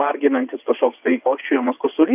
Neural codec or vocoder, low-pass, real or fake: vocoder, 22.05 kHz, 80 mel bands, WaveNeXt; 3.6 kHz; fake